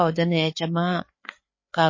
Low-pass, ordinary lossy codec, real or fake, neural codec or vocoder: 7.2 kHz; MP3, 32 kbps; fake; codec, 16 kHz, 4 kbps, FreqCodec, larger model